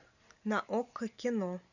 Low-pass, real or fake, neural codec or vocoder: 7.2 kHz; real; none